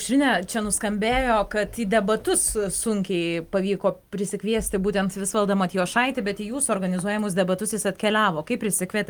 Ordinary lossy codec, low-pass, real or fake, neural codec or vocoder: Opus, 32 kbps; 19.8 kHz; real; none